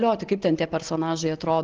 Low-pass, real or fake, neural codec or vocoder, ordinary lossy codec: 7.2 kHz; real; none; Opus, 16 kbps